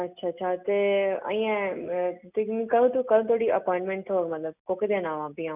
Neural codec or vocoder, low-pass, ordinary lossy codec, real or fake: none; 3.6 kHz; none; real